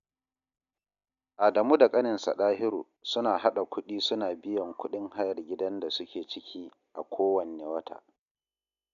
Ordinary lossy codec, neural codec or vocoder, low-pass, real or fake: none; none; 7.2 kHz; real